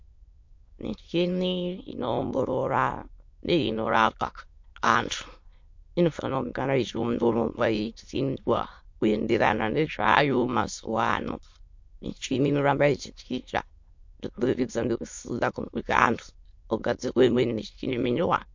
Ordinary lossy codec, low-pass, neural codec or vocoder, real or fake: MP3, 48 kbps; 7.2 kHz; autoencoder, 22.05 kHz, a latent of 192 numbers a frame, VITS, trained on many speakers; fake